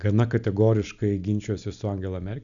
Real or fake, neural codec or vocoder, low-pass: real; none; 7.2 kHz